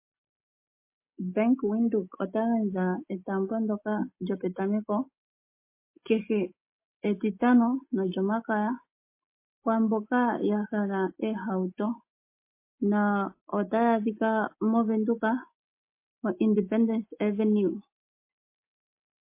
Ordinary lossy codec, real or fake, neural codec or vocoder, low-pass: MP3, 24 kbps; real; none; 3.6 kHz